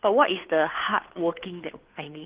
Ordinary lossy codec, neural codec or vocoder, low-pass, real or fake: Opus, 16 kbps; codec, 16 kHz, 2 kbps, FunCodec, trained on Chinese and English, 25 frames a second; 3.6 kHz; fake